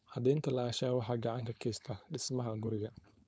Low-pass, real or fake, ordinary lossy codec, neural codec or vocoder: none; fake; none; codec, 16 kHz, 4.8 kbps, FACodec